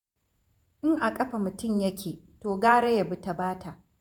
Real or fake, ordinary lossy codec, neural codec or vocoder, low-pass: fake; none; vocoder, 48 kHz, 128 mel bands, Vocos; none